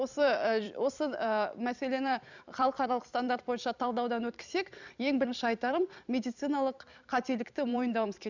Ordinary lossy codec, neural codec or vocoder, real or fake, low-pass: none; vocoder, 44.1 kHz, 128 mel bands every 256 samples, BigVGAN v2; fake; 7.2 kHz